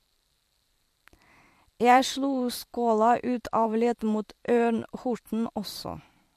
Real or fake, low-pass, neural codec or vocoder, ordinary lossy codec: fake; 14.4 kHz; vocoder, 44.1 kHz, 128 mel bands every 512 samples, BigVGAN v2; MP3, 64 kbps